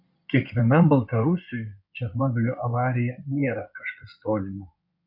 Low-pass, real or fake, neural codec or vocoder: 5.4 kHz; fake; codec, 16 kHz in and 24 kHz out, 2.2 kbps, FireRedTTS-2 codec